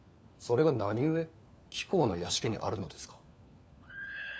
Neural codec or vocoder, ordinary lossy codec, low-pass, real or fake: codec, 16 kHz, 4 kbps, FunCodec, trained on LibriTTS, 50 frames a second; none; none; fake